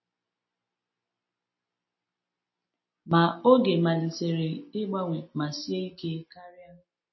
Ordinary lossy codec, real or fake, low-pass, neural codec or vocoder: MP3, 24 kbps; real; 7.2 kHz; none